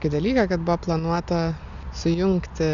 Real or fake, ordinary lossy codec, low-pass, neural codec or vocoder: real; MP3, 96 kbps; 7.2 kHz; none